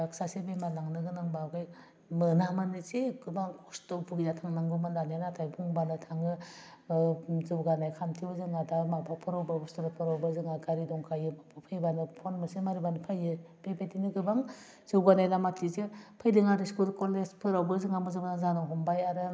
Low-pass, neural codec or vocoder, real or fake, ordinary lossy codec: none; none; real; none